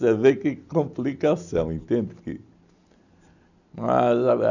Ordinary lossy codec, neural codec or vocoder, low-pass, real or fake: none; none; 7.2 kHz; real